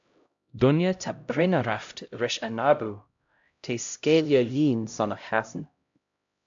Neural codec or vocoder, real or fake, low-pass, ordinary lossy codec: codec, 16 kHz, 0.5 kbps, X-Codec, HuBERT features, trained on LibriSpeech; fake; 7.2 kHz; AAC, 64 kbps